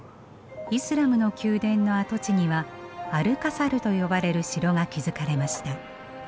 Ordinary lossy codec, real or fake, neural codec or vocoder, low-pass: none; real; none; none